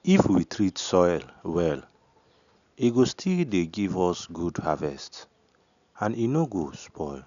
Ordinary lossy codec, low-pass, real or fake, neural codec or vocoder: none; 7.2 kHz; real; none